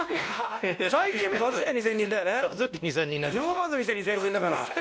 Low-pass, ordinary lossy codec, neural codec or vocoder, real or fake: none; none; codec, 16 kHz, 1 kbps, X-Codec, WavLM features, trained on Multilingual LibriSpeech; fake